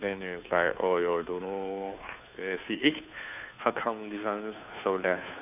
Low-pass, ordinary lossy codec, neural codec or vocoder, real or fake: 3.6 kHz; none; codec, 16 kHz, 2 kbps, FunCodec, trained on Chinese and English, 25 frames a second; fake